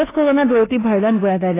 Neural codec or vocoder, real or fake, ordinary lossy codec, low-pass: codec, 24 kHz, 1.2 kbps, DualCodec; fake; AAC, 16 kbps; 3.6 kHz